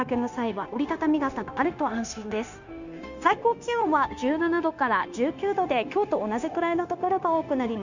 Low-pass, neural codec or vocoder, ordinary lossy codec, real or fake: 7.2 kHz; codec, 16 kHz, 0.9 kbps, LongCat-Audio-Codec; none; fake